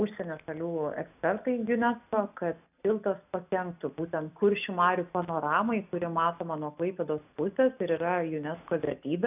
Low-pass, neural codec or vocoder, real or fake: 3.6 kHz; none; real